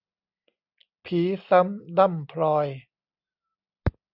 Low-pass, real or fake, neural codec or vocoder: 5.4 kHz; real; none